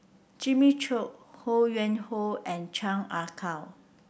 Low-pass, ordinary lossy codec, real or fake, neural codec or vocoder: none; none; real; none